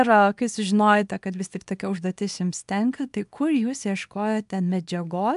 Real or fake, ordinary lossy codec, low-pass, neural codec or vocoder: fake; AAC, 96 kbps; 10.8 kHz; codec, 24 kHz, 0.9 kbps, WavTokenizer, small release